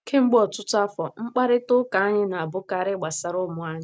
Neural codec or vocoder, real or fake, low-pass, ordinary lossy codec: none; real; none; none